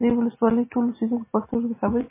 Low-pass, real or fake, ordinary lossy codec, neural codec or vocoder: 3.6 kHz; real; MP3, 16 kbps; none